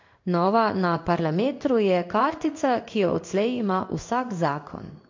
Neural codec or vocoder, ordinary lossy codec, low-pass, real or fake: codec, 16 kHz in and 24 kHz out, 1 kbps, XY-Tokenizer; MP3, 48 kbps; 7.2 kHz; fake